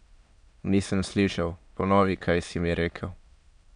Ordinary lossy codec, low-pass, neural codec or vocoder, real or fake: none; 9.9 kHz; autoencoder, 22.05 kHz, a latent of 192 numbers a frame, VITS, trained on many speakers; fake